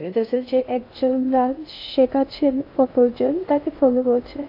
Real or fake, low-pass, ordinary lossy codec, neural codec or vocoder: fake; 5.4 kHz; AAC, 32 kbps; codec, 16 kHz in and 24 kHz out, 0.6 kbps, FocalCodec, streaming, 2048 codes